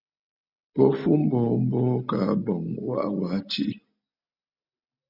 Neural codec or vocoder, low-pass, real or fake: none; 5.4 kHz; real